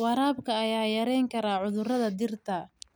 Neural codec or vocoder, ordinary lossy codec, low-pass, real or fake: none; none; none; real